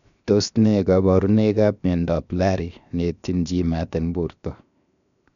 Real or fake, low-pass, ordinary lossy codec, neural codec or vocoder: fake; 7.2 kHz; none; codec, 16 kHz, 0.7 kbps, FocalCodec